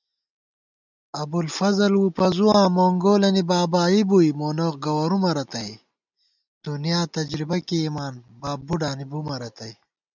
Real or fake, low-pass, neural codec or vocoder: real; 7.2 kHz; none